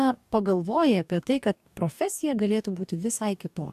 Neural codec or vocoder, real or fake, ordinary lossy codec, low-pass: codec, 44.1 kHz, 2.6 kbps, DAC; fake; AAC, 96 kbps; 14.4 kHz